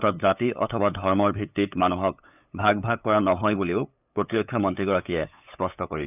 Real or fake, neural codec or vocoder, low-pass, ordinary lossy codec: fake; codec, 16 kHz, 8 kbps, FunCodec, trained on LibriTTS, 25 frames a second; 3.6 kHz; none